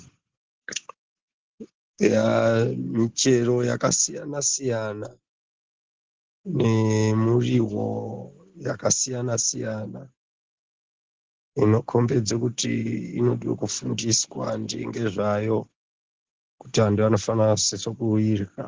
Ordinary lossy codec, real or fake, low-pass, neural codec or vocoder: Opus, 16 kbps; real; 7.2 kHz; none